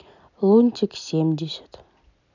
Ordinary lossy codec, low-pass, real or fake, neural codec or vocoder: none; 7.2 kHz; fake; vocoder, 44.1 kHz, 128 mel bands every 256 samples, BigVGAN v2